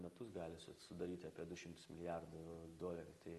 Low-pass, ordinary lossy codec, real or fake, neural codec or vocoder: 14.4 kHz; Opus, 32 kbps; real; none